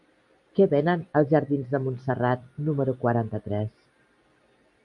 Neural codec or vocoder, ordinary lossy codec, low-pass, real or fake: vocoder, 44.1 kHz, 128 mel bands every 256 samples, BigVGAN v2; MP3, 96 kbps; 10.8 kHz; fake